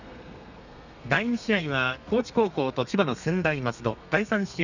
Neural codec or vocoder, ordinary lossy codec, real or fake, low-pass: codec, 32 kHz, 1.9 kbps, SNAC; none; fake; 7.2 kHz